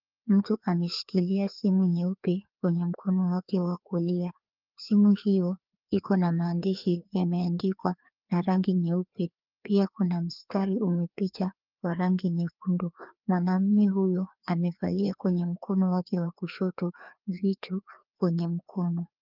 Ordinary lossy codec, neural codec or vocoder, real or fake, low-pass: Opus, 24 kbps; codec, 16 kHz, 2 kbps, FreqCodec, larger model; fake; 5.4 kHz